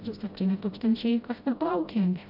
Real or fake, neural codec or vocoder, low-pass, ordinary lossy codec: fake; codec, 16 kHz, 0.5 kbps, FreqCodec, smaller model; 5.4 kHz; AAC, 48 kbps